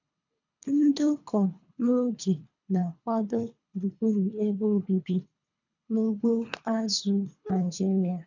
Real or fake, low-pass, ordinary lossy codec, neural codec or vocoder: fake; 7.2 kHz; none; codec, 24 kHz, 3 kbps, HILCodec